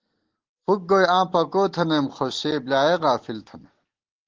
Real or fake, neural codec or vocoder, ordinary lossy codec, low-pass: real; none; Opus, 16 kbps; 7.2 kHz